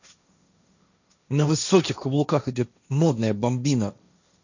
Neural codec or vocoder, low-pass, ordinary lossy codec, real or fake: codec, 16 kHz, 1.1 kbps, Voila-Tokenizer; 7.2 kHz; none; fake